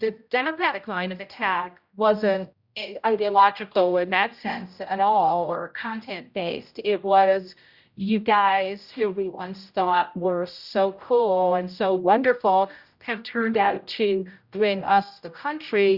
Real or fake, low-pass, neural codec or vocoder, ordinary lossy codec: fake; 5.4 kHz; codec, 16 kHz, 0.5 kbps, X-Codec, HuBERT features, trained on general audio; Opus, 64 kbps